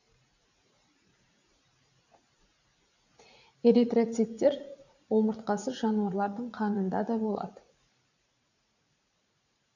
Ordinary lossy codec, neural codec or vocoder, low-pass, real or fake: none; vocoder, 44.1 kHz, 80 mel bands, Vocos; 7.2 kHz; fake